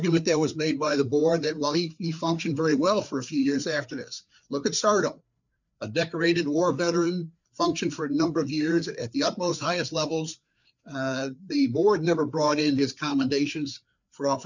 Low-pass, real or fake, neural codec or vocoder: 7.2 kHz; fake; codec, 16 kHz, 4 kbps, FreqCodec, larger model